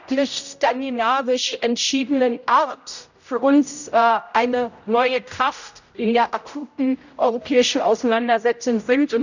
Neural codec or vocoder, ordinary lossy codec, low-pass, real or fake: codec, 16 kHz, 0.5 kbps, X-Codec, HuBERT features, trained on general audio; none; 7.2 kHz; fake